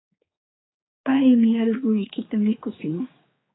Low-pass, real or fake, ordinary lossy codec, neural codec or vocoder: 7.2 kHz; fake; AAC, 16 kbps; codec, 16 kHz, 4 kbps, X-Codec, HuBERT features, trained on general audio